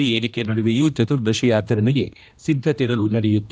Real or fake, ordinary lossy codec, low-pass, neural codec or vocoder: fake; none; none; codec, 16 kHz, 1 kbps, X-Codec, HuBERT features, trained on general audio